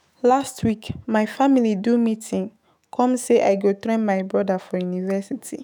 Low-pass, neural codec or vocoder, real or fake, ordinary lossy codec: none; autoencoder, 48 kHz, 128 numbers a frame, DAC-VAE, trained on Japanese speech; fake; none